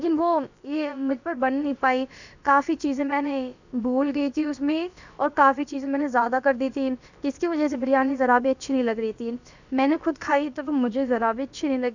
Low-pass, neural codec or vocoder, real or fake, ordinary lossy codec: 7.2 kHz; codec, 16 kHz, about 1 kbps, DyCAST, with the encoder's durations; fake; none